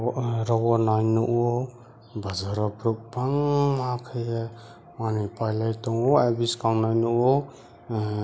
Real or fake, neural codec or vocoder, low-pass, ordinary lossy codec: real; none; none; none